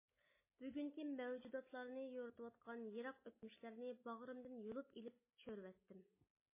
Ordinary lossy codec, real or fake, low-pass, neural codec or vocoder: MP3, 16 kbps; real; 3.6 kHz; none